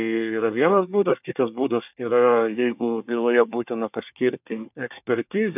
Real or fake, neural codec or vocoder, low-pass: fake; codec, 24 kHz, 1 kbps, SNAC; 3.6 kHz